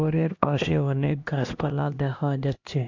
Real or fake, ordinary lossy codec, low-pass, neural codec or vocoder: fake; AAC, 48 kbps; 7.2 kHz; codec, 16 kHz, 1 kbps, X-Codec, HuBERT features, trained on LibriSpeech